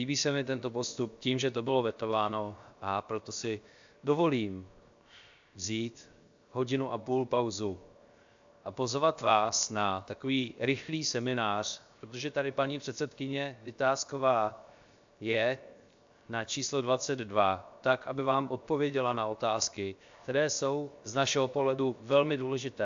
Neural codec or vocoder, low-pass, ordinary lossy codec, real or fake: codec, 16 kHz, 0.7 kbps, FocalCodec; 7.2 kHz; AAC, 64 kbps; fake